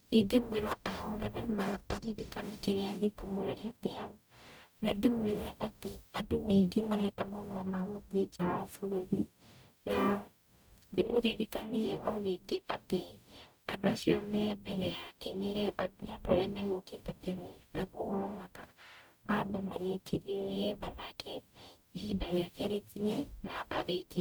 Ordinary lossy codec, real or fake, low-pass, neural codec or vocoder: none; fake; none; codec, 44.1 kHz, 0.9 kbps, DAC